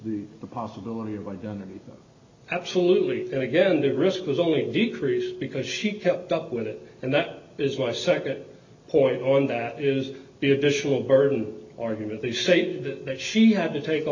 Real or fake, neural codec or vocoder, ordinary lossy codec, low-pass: real; none; MP3, 64 kbps; 7.2 kHz